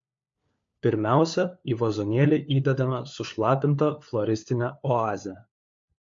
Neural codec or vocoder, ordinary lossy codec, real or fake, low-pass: codec, 16 kHz, 4 kbps, FunCodec, trained on LibriTTS, 50 frames a second; MP3, 48 kbps; fake; 7.2 kHz